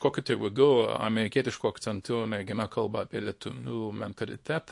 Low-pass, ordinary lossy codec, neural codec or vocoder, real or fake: 10.8 kHz; MP3, 48 kbps; codec, 24 kHz, 0.9 kbps, WavTokenizer, small release; fake